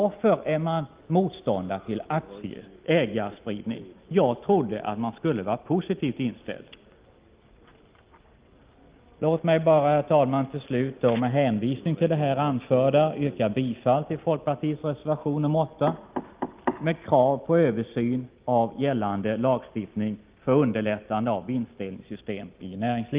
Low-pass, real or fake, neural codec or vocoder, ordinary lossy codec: 3.6 kHz; real; none; Opus, 32 kbps